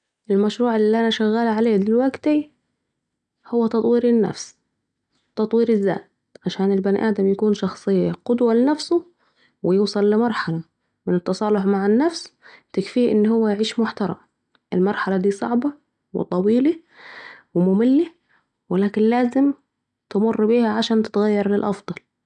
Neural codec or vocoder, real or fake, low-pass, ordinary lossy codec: none; real; 9.9 kHz; none